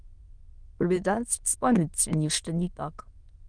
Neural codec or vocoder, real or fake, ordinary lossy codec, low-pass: autoencoder, 22.05 kHz, a latent of 192 numbers a frame, VITS, trained on many speakers; fake; Opus, 24 kbps; 9.9 kHz